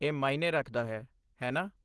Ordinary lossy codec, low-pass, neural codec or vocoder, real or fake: Opus, 16 kbps; 10.8 kHz; none; real